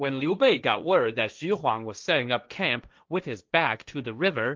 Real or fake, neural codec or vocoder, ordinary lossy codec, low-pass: fake; codec, 16 kHz, 1.1 kbps, Voila-Tokenizer; Opus, 24 kbps; 7.2 kHz